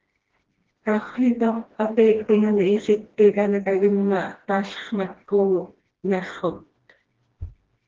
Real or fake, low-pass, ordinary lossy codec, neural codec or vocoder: fake; 7.2 kHz; Opus, 16 kbps; codec, 16 kHz, 1 kbps, FreqCodec, smaller model